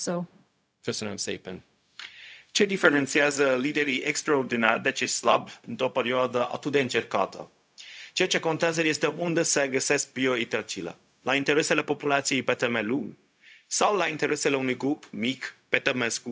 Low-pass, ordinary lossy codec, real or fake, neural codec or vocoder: none; none; fake; codec, 16 kHz, 0.4 kbps, LongCat-Audio-Codec